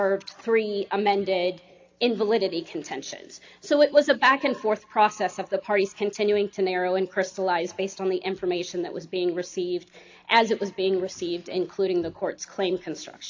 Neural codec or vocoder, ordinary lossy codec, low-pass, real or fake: none; AAC, 48 kbps; 7.2 kHz; real